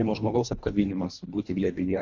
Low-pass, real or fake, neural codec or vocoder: 7.2 kHz; fake; codec, 24 kHz, 1.5 kbps, HILCodec